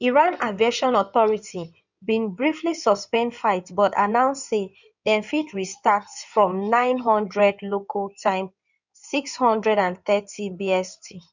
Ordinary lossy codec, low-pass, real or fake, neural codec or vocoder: none; 7.2 kHz; fake; codec, 16 kHz in and 24 kHz out, 2.2 kbps, FireRedTTS-2 codec